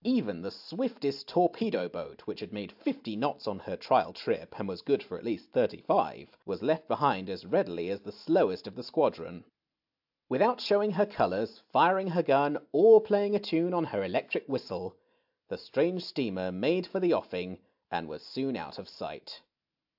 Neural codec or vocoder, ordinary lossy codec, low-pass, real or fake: none; AAC, 48 kbps; 5.4 kHz; real